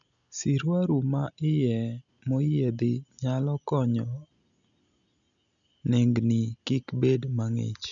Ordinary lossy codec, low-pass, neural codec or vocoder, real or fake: none; 7.2 kHz; none; real